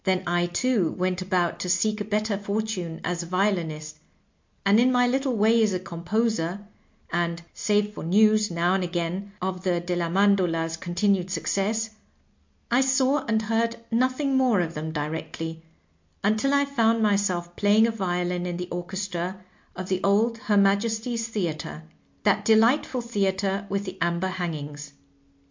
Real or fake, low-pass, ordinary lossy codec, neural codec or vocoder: real; 7.2 kHz; MP3, 48 kbps; none